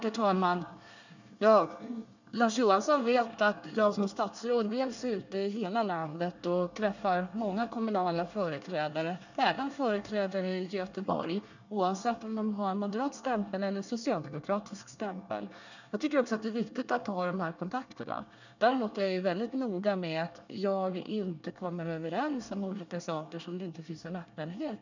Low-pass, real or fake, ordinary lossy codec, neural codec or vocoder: 7.2 kHz; fake; none; codec, 24 kHz, 1 kbps, SNAC